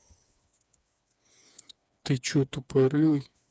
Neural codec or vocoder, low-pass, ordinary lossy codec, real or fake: codec, 16 kHz, 4 kbps, FreqCodec, smaller model; none; none; fake